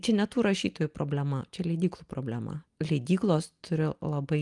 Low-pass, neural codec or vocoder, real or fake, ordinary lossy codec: 10.8 kHz; none; real; Opus, 32 kbps